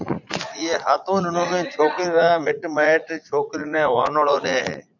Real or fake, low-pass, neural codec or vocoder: fake; 7.2 kHz; vocoder, 44.1 kHz, 80 mel bands, Vocos